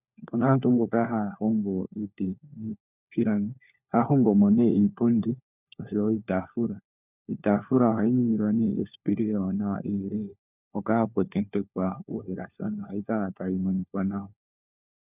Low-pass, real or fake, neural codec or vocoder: 3.6 kHz; fake; codec, 16 kHz, 4 kbps, FunCodec, trained on LibriTTS, 50 frames a second